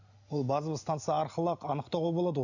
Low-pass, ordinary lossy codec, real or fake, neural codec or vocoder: 7.2 kHz; none; real; none